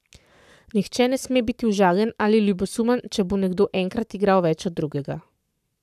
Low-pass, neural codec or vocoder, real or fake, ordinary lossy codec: 14.4 kHz; codec, 44.1 kHz, 7.8 kbps, Pupu-Codec; fake; none